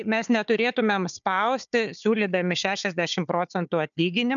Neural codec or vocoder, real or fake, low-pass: codec, 16 kHz, 4 kbps, FunCodec, trained on Chinese and English, 50 frames a second; fake; 7.2 kHz